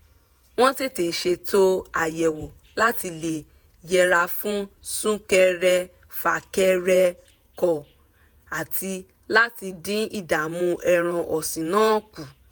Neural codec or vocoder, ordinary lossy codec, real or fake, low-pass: vocoder, 48 kHz, 128 mel bands, Vocos; none; fake; none